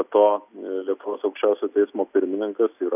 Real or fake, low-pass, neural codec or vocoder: real; 3.6 kHz; none